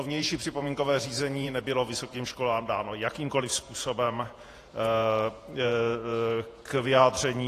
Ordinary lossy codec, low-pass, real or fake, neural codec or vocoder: AAC, 48 kbps; 14.4 kHz; fake; vocoder, 48 kHz, 128 mel bands, Vocos